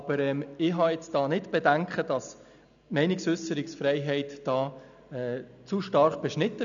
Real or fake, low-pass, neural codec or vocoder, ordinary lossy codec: real; 7.2 kHz; none; none